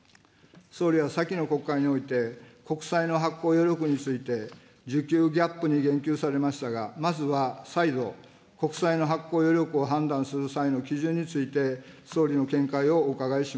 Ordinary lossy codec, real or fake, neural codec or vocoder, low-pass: none; real; none; none